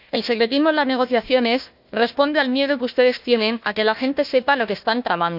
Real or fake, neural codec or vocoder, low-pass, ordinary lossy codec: fake; codec, 16 kHz, 1 kbps, FunCodec, trained on Chinese and English, 50 frames a second; 5.4 kHz; none